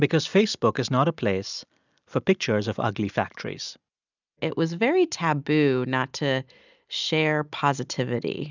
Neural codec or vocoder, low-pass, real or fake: none; 7.2 kHz; real